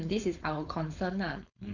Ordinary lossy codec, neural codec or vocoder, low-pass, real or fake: none; codec, 16 kHz, 4.8 kbps, FACodec; 7.2 kHz; fake